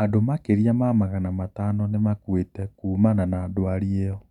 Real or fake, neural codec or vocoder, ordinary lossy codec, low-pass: real; none; none; 19.8 kHz